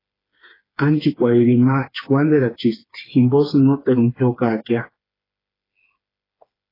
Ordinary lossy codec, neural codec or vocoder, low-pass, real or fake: AAC, 24 kbps; codec, 16 kHz, 4 kbps, FreqCodec, smaller model; 5.4 kHz; fake